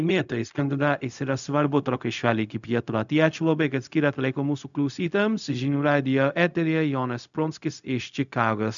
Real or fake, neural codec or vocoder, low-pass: fake; codec, 16 kHz, 0.4 kbps, LongCat-Audio-Codec; 7.2 kHz